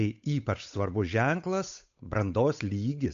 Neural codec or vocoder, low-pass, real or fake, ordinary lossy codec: codec, 16 kHz, 8 kbps, FunCodec, trained on Chinese and English, 25 frames a second; 7.2 kHz; fake; AAC, 64 kbps